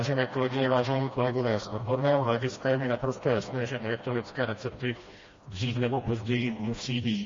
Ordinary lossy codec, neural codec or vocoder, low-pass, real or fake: MP3, 32 kbps; codec, 16 kHz, 1 kbps, FreqCodec, smaller model; 7.2 kHz; fake